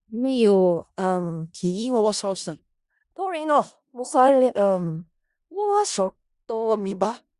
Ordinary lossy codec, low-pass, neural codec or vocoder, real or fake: Opus, 64 kbps; 10.8 kHz; codec, 16 kHz in and 24 kHz out, 0.4 kbps, LongCat-Audio-Codec, four codebook decoder; fake